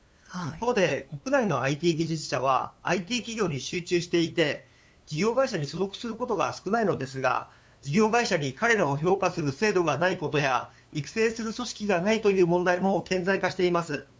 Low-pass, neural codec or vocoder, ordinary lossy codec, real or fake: none; codec, 16 kHz, 2 kbps, FunCodec, trained on LibriTTS, 25 frames a second; none; fake